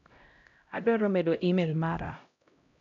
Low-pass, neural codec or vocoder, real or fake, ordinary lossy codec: 7.2 kHz; codec, 16 kHz, 0.5 kbps, X-Codec, HuBERT features, trained on LibriSpeech; fake; none